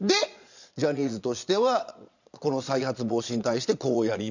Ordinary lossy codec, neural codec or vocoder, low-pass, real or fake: none; vocoder, 22.05 kHz, 80 mel bands, Vocos; 7.2 kHz; fake